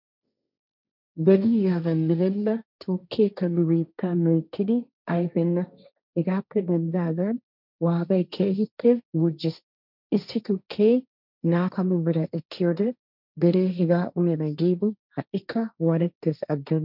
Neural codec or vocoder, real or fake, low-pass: codec, 16 kHz, 1.1 kbps, Voila-Tokenizer; fake; 5.4 kHz